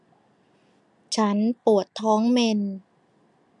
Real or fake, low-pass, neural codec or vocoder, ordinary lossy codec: real; 10.8 kHz; none; none